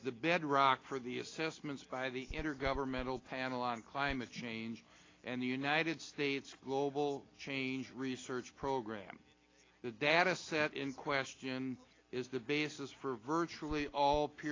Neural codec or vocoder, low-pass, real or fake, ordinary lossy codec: none; 7.2 kHz; real; AAC, 32 kbps